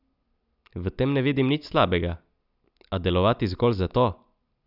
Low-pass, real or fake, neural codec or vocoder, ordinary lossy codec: 5.4 kHz; real; none; none